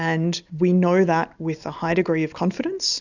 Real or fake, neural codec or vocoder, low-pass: real; none; 7.2 kHz